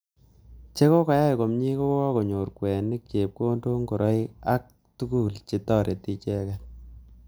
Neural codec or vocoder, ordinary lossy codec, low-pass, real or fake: none; none; none; real